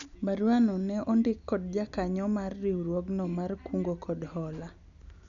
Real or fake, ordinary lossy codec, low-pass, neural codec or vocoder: real; none; 7.2 kHz; none